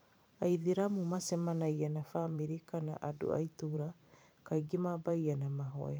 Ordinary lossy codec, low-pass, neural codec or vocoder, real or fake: none; none; vocoder, 44.1 kHz, 128 mel bands every 512 samples, BigVGAN v2; fake